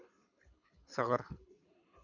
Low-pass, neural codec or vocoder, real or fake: 7.2 kHz; codec, 24 kHz, 6 kbps, HILCodec; fake